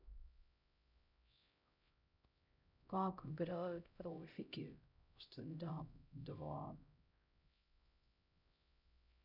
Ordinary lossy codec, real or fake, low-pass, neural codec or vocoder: none; fake; 5.4 kHz; codec, 16 kHz, 0.5 kbps, X-Codec, HuBERT features, trained on LibriSpeech